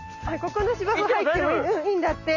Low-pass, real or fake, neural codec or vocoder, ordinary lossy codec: 7.2 kHz; real; none; none